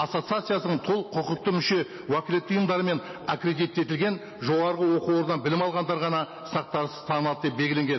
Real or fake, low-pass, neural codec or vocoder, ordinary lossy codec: real; 7.2 kHz; none; MP3, 24 kbps